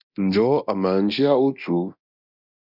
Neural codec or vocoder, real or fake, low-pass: codec, 24 kHz, 0.9 kbps, DualCodec; fake; 5.4 kHz